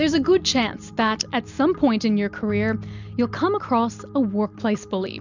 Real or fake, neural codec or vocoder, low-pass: real; none; 7.2 kHz